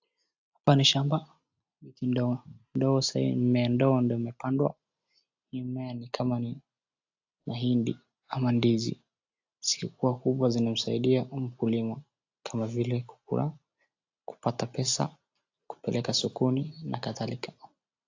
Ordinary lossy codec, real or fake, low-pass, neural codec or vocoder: AAC, 48 kbps; real; 7.2 kHz; none